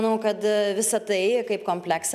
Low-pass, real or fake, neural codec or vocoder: 14.4 kHz; real; none